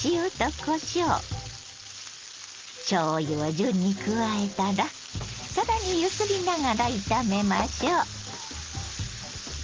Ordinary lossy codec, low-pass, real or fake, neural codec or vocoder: Opus, 16 kbps; 7.2 kHz; real; none